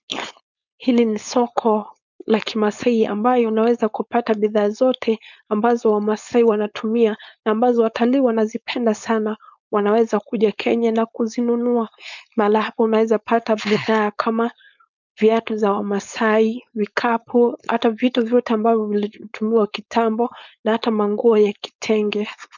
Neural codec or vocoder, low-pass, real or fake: codec, 16 kHz, 4.8 kbps, FACodec; 7.2 kHz; fake